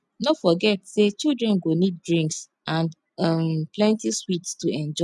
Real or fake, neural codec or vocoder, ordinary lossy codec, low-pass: real; none; none; none